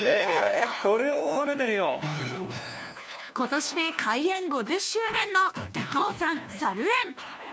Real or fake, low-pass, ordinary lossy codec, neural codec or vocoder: fake; none; none; codec, 16 kHz, 1 kbps, FunCodec, trained on LibriTTS, 50 frames a second